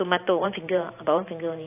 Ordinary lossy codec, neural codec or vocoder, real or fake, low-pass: AAC, 24 kbps; vocoder, 44.1 kHz, 128 mel bands every 512 samples, BigVGAN v2; fake; 3.6 kHz